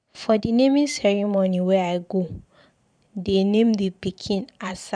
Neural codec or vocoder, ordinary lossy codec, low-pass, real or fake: vocoder, 44.1 kHz, 128 mel bands every 512 samples, BigVGAN v2; none; 9.9 kHz; fake